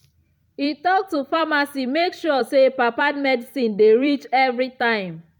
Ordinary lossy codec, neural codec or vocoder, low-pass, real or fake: MP3, 96 kbps; vocoder, 44.1 kHz, 128 mel bands every 256 samples, BigVGAN v2; 19.8 kHz; fake